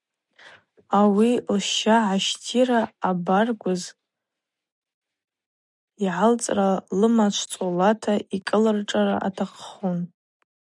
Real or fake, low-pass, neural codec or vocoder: real; 10.8 kHz; none